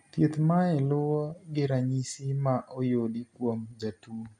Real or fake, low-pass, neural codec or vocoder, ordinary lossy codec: real; none; none; none